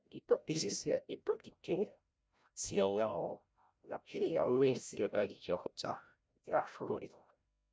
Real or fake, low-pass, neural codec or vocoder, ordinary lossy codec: fake; none; codec, 16 kHz, 0.5 kbps, FreqCodec, larger model; none